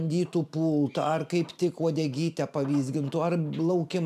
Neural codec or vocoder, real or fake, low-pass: vocoder, 44.1 kHz, 128 mel bands every 512 samples, BigVGAN v2; fake; 14.4 kHz